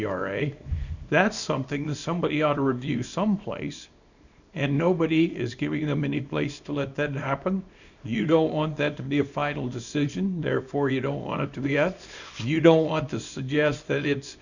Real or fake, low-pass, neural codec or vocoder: fake; 7.2 kHz; codec, 24 kHz, 0.9 kbps, WavTokenizer, small release